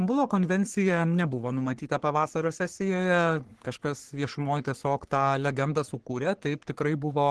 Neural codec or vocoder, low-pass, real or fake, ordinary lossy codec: codec, 44.1 kHz, 3.4 kbps, Pupu-Codec; 10.8 kHz; fake; Opus, 16 kbps